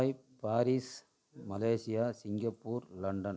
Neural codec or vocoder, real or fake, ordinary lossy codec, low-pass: none; real; none; none